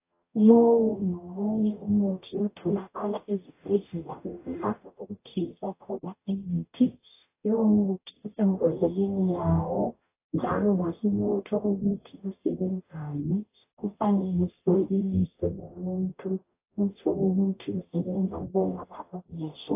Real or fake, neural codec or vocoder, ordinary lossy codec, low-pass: fake; codec, 44.1 kHz, 0.9 kbps, DAC; AAC, 24 kbps; 3.6 kHz